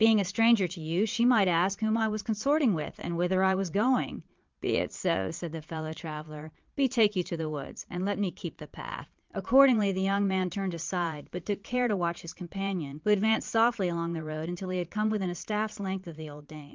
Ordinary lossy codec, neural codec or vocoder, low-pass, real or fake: Opus, 24 kbps; vocoder, 22.05 kHz, 80 mel bands, Vocos; 7.2 kHz; fake